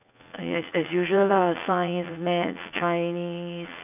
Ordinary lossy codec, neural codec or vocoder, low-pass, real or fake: none; vocoder, 44.1 kHz, 80 mel bands, Vocos; 3.6 kHz; fake